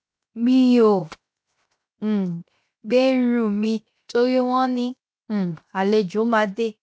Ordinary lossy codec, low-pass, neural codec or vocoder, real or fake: none; none; codec, 16 kHz, 0.7 kbps, FocalCodec; fake